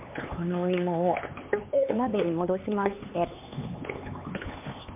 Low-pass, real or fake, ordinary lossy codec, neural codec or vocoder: 3.6 kHz; fake; MP3, 32 kbps; codec, 16 kHz, 4 kbps, X-Codec, HuBERT features, trained on LibriSpeech